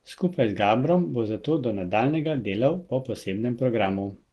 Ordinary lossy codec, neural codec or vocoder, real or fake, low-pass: Opus, 16 kbps; none; real; 10.8 kHz